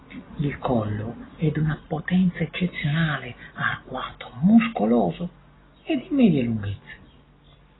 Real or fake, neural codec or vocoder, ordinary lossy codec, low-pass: real; none; AAC, 16 kbps; 7.2 kHz